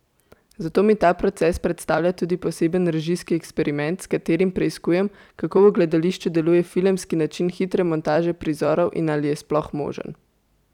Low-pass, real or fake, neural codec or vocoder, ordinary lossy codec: 19.8 kHz; fake; vocoder, 44.1 kHz, 128 mel bands every 256 samples, BigVGAN v2; none